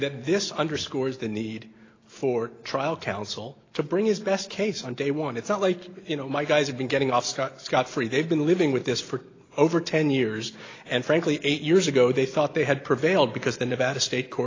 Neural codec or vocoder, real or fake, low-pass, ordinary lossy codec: none; real; 7.2 kHz; AAC, 32 kbps